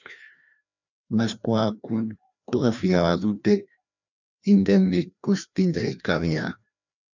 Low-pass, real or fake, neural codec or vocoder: 7.2 kHz; fake; codec, 16 kHz, 1 kbps, FreqCodec, larger model